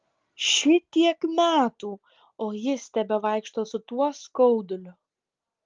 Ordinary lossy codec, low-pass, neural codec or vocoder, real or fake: Opus, 32 kbps; 7.2 kHz; none; real